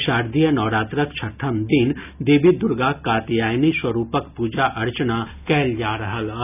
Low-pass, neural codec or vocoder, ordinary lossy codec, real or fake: 3.6 kHz; none; none; real